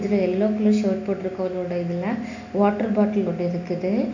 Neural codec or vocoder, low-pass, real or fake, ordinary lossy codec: none; 7.2 kHz; real; none